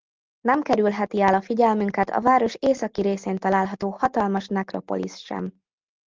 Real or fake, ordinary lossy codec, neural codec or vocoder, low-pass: real; Opus, 32 kbps; none; 7.2 kHz